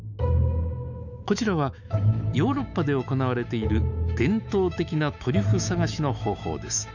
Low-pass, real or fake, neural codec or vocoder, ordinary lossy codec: 7.2 kHz; fake; autoencoder, 48 kHz, 128 numbers a frame, DAC-VAE, trained on Japanese speech; none